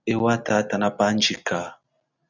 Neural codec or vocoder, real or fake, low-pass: none; real; 7.2 kHz